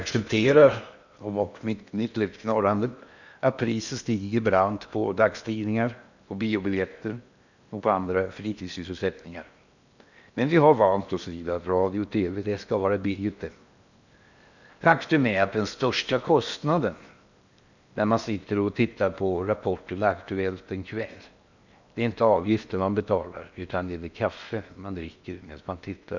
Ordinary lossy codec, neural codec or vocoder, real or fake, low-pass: none; codec, 16 kHz in and 24 kHz out, 0.8 kbps, FocalCodec, streaming, 65536 codes; fake; 7.2 kHz